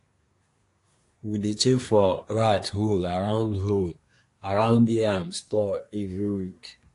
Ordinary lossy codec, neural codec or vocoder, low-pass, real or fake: AAC, 48 kbps; codec, 24 kHz, 1 kbps, SNAC; 10.8 kHz; fake